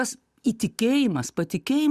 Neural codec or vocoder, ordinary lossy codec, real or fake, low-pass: none; Opus, 64 kbps; real; 14.4 kHz